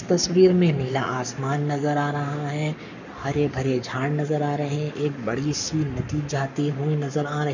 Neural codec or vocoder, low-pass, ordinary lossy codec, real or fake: codec, 44.1 kHz, 7.8 kbps, Pupu-Codec; 7.2 kHz; none; fake